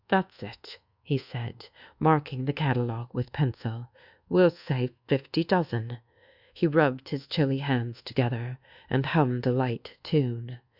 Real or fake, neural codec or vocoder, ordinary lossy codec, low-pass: fake; codec, 24 kHz, 1.2 kbps, DualCodec; Opus, 64 kbps; 5.4 kHz